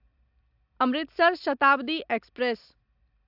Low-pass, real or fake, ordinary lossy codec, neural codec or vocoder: 5.4 kHz; real; AAC, 48 kbps; none